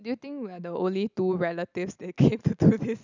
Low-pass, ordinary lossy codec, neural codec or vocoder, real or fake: 7.2 kHz; none; none; real